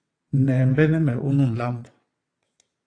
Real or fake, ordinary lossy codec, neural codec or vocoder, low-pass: fake; AAC, 48 kbps; vocoder, 22.05 kHz, 80 mel bands, WaveNeXt; 9.9 kHz